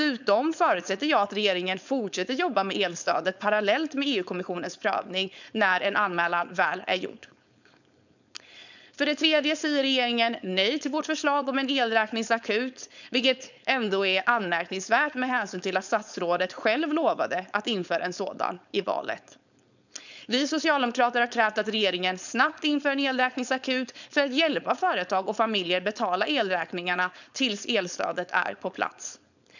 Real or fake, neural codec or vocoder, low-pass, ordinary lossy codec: fake; codec, 16 kHz, 4.8 kbps, FACodec; 7.2 kHz; none